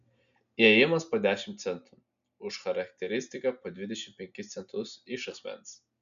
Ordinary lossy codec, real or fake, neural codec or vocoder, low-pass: MP3, 96 kbps; real; none; 7.2 kHz